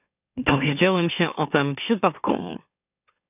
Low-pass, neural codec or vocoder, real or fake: 3.6 kHz; autoencoder, 44.1 kHz, a latent of 192 numbers a frame, MeloTTS; fake